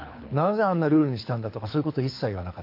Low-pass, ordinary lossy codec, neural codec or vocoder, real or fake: 5.4 kHz; MP3, 32 kbps; codec, 24 kHz, 6 kbps, HILCodec; fake